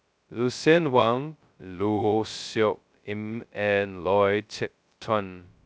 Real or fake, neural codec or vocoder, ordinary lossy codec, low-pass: fake; codec, 16 kHz, 0.2 kbps, FocalCodec; none; none